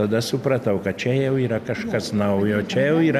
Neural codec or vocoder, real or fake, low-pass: none; real; 14.4 kHz